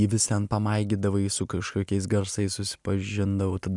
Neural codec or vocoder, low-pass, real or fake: none; 10.8 kHz; real